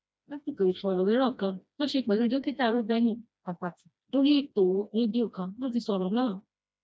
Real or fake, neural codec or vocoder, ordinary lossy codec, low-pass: fake; codec, 16 kHz, 1 kbps, FreqCodec, smaller model; none; none